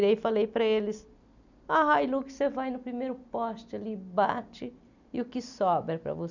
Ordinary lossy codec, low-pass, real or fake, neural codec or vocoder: none; 7.2 kHz; real; none